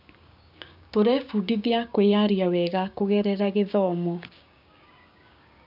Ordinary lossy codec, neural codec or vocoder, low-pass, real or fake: none; codec, 16 kHz, 6 kbps, DAC; 5.4 kHz; fake